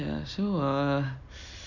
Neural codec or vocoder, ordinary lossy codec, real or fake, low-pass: none; none; real; 7.2 kHz